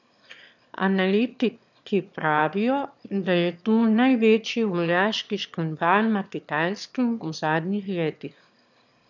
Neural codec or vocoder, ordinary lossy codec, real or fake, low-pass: autoencoder, 22.05 kHz, a latent of 192 numbers a frame, VITS, trained on one speaker; none; fake; 7.2 kHz